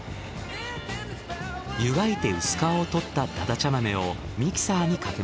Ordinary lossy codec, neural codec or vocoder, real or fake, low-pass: none; none; real; none